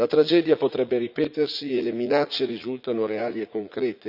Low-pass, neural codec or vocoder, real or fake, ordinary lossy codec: 5.4 kHz; vocoder, 44.1 kHz, 80 mel bands, Vocos; fake; none